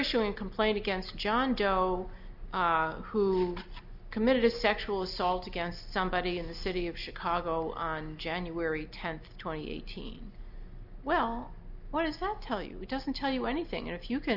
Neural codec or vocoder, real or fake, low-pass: none; real; 5.4 kHz